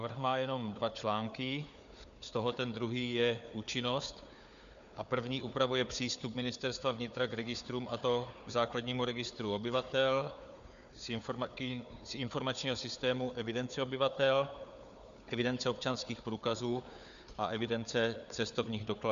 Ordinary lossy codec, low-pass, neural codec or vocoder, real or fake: MP3, 96 kbps; 7.2 kHz; codec, 16 kHz, 4 kbps, FunCodec, trained on Chinese and English, 50 frames a second; fake